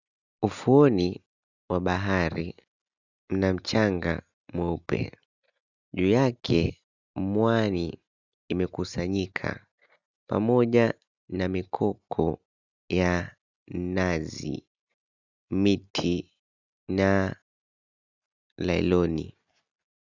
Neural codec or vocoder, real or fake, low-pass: none; real; 7.2 kHz